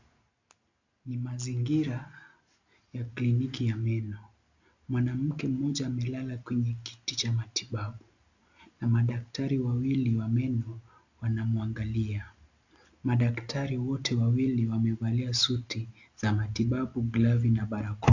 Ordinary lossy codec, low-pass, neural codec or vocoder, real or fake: AAC, 48 kbps; 7.2 kHz; none; real